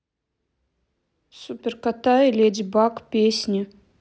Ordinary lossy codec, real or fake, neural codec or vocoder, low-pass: none; real; none; none